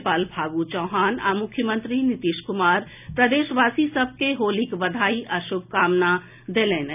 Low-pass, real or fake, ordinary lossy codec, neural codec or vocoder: 3.6 kHz; real; none; none